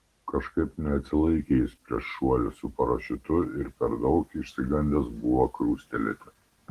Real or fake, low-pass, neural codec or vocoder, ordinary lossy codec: real; 14.4 kHz; none; Opus, 16 kbps